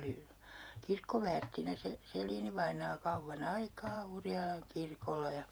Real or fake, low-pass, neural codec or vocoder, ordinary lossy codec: fake; none; vocoder, 48 kHz, 128 mel bands, Vocos; none